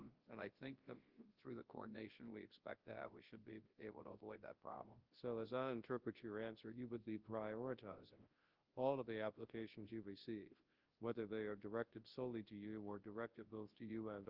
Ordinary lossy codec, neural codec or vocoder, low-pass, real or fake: Opus, 16 kbps; codec, 24 kHz, 0.9 kbps, WavTokenizer, large speech release; 5.4 kHz; fake